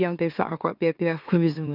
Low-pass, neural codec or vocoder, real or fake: 5.4 kHz; autoencoder, 44.1 kHz, a latent of 192 numbers a frame, MeloTTS; fake